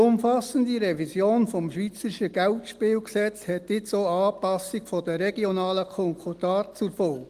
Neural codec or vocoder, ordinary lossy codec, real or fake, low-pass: none; Opus, 24 kbps; real; 14.4 kHz